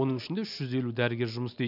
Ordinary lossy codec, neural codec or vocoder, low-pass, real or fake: none; none; 5.4 kHz; real